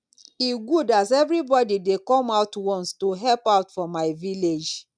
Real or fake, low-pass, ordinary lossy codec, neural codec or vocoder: real; 9.9 kHz; none; none